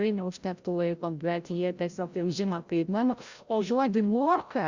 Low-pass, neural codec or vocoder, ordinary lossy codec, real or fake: 7.2 kHz; codec, 16 kHz, 0.5 kbps, FreqCodec, larger model; Opus, 64 kbps; fake